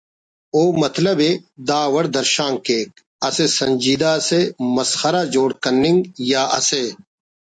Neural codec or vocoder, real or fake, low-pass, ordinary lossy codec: none; real; 9.9 kHz; MP3, 48 kbps